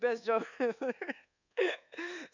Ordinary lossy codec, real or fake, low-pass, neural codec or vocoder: none; fake; 7.2 kHz; codec, 24 kHz, 1.2 kbps, DualCodec